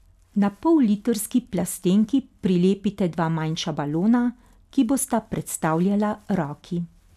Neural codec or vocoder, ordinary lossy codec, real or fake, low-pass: none; none; real; 14.4 kHz